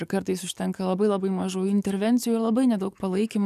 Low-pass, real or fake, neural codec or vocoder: 14.4 kHz; fake; codec, 44.1 kHz, 7.8 kbps, DAC